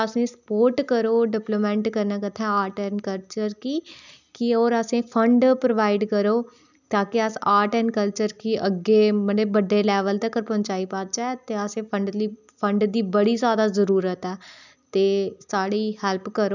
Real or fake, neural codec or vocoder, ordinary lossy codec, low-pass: real; none; none; 7.2 kHz